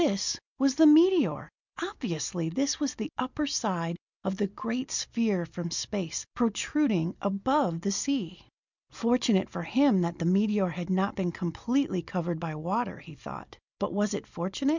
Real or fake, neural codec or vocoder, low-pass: real; none; 7.2 kHz